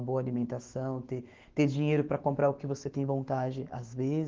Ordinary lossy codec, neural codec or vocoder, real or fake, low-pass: Opus, 16 kbps; autoencoder, 48 kHz, 128 numbers a frame, DAC-VAE, trained on Japanese speech; fake; 7.2 kHz